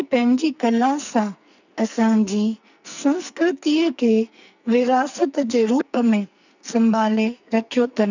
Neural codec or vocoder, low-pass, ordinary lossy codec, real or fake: codec, 44.1 kHz, 2.6 kbps, SNAC; 7.2 kHz; none; fake